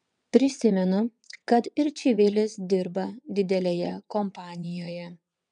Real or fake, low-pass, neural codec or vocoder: fake; 9.9 kHz; vocoder, 22.05 kHz, 80 mel bands, WaveNeXt